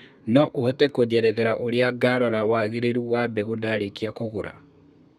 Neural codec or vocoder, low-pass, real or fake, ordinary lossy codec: codec, 32 kHz, 1.9 kbps, SNAC; 14.4 kHz; fake; none